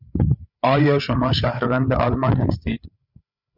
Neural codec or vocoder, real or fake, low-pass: codec, 16 kHz, 8 kbps, FreqCodec, larger model; fake; 5.4 kHz